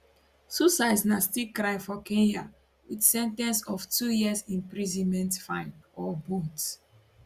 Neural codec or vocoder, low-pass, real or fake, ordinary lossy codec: none; 14.4 kHz; real; Opus, 64 kbps